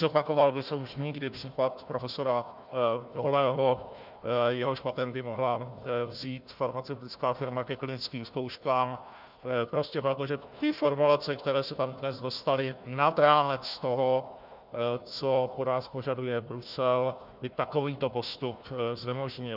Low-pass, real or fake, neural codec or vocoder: 5.4 kHz; fake; codec, 16 kHz, 1 kbps, FunCodec, trained on Chinese and English, 50 frames a second